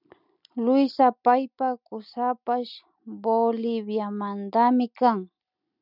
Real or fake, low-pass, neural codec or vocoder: real; 5.4 kHz; none